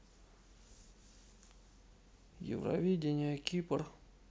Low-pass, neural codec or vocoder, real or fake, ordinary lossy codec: none; none; real; none